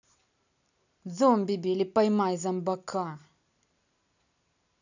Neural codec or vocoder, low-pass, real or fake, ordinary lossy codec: none; 7.2 kHz; real; none